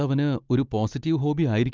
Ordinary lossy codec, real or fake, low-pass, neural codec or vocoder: Opus, 32 kbps; real; 7.2 kHz; none